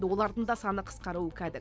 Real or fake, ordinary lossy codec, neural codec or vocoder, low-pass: real; none; none; none